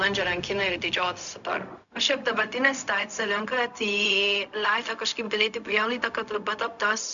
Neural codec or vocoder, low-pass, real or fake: codec, 16 kHz, 0.4 kbps, LongCat-Audio-Codec; 7.2 kHz; fake